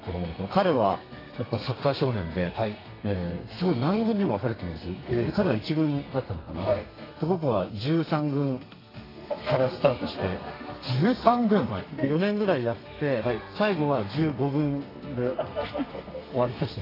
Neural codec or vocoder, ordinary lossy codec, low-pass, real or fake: codec, 32 kHz, 1.9 kbps, SNAC; AAC, 24 kbps; 5.4 kHz; fake